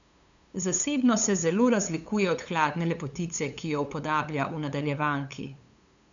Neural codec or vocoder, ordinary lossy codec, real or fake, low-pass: codec, 16 kHz, 8 kbps, FunCodec, trained on LibriTTS, 25 frames a second; none; fake; 7.2 kHz